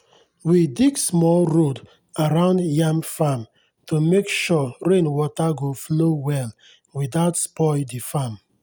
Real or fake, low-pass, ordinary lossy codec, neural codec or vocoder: real; none; none; none